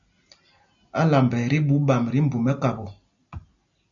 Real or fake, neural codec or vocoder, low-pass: real; none; 7.2 kHz